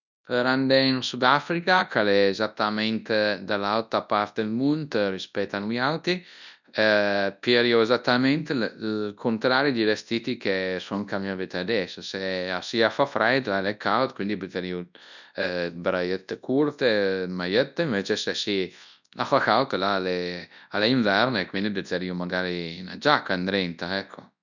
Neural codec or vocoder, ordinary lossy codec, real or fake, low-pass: codec, 24 kHz, 0.9 kbps, WavTokenizer, large speech release; none; fake; 7.2 kHz